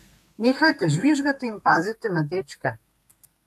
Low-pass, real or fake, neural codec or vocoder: 14.4 kHz; fake; codec, 32 kHz, 1.9 kbps, SNAC